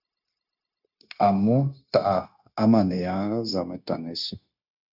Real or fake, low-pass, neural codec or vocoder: fake; 5.4 kHz; codec, 16 kHz, 0.9 kbps, LongCat-Audio-Codec